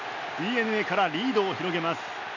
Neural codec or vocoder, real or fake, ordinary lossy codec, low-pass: none; real; none; 7.2 kHz